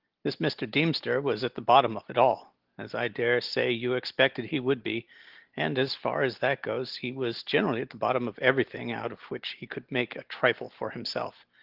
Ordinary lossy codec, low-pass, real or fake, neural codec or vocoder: Opus, 16 kbps; 5.4 kHz; real; none